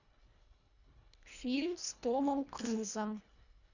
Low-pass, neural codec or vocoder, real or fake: 7.2 kHz; codec, 24 kHz, 1.5 kbps, HILCodec; fake